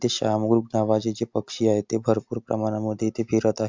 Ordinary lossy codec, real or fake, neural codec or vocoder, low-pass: MP3, 64 kbps; real; none; 7.2 kHz